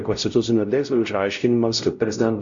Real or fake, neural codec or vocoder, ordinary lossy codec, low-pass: fake; codec, 16 kHz, 0.5 kbps, X-Codec, WavLM features, trained on Multilingual LibriSpeech; Opus, 64 kbps; 7.2 kHz